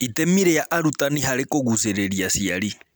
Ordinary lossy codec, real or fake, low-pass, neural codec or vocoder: none; real; none; none